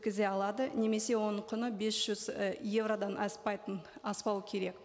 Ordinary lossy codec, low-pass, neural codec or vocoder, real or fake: none; none; none; real